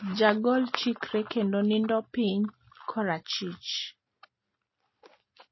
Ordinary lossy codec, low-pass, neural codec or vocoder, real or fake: MP3, 24 kbps; 7.2 kHz; none; real